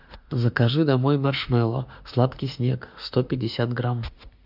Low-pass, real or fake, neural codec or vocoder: 5.4 kHz; fake; autoencoder, 48 kHz, 32 numbers a frame, DAC-VAE, trained on Japanese speech